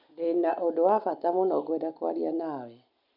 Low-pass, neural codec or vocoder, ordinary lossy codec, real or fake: 5.4 kHz; none; none; real